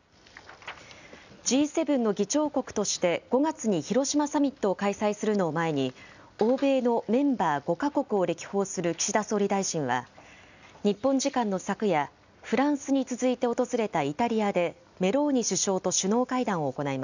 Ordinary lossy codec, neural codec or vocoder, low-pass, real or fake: none; none; 7.2 kHz; real